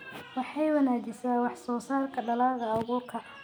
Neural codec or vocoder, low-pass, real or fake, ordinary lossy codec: none; none; real; none